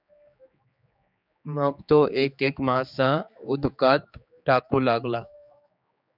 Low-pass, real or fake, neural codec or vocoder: 5.4 kHz; fake; codec, 16 kHz, 4 kbps, X-Codec, HuBERT features, trained on general audio